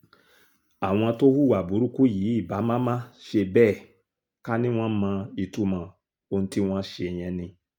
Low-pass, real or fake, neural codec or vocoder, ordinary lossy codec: 19.8 kHz; real; none; none